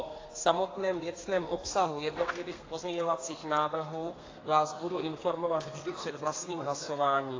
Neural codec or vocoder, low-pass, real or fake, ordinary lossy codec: codec, 32 kHz, 1.9 kbps, SNAC; 7.2 kHz; fake; AAC, 32 kbps